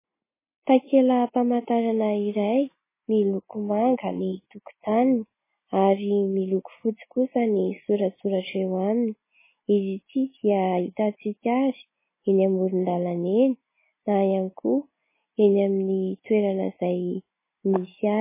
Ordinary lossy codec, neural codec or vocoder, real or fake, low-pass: MP3, 16 kbps; none; real; 3.6 kHz